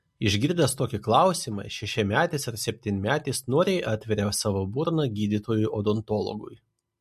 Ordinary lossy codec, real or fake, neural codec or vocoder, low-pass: MP3, 64 kbps; real; none; 14.4 kHz